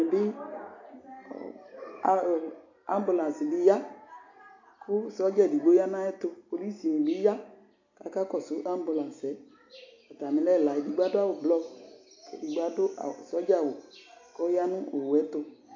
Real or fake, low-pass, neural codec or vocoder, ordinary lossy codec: real; 7.2 kHz; none; AAC, 48 kbps